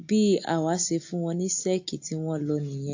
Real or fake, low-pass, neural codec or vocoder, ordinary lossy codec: real; 7.2 kHz; none; AAC, 48 kbps